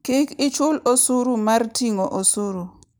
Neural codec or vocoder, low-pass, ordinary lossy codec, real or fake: none; none; none; real